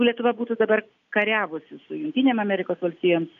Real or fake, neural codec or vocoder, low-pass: real; none; 7.2 kHz